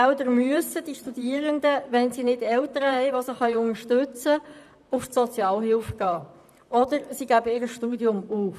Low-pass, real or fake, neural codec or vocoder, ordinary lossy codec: 14.4 kHz; fake; vocoder, 44.1 kHz, 128 mel bands, Pupu-Vocoder; none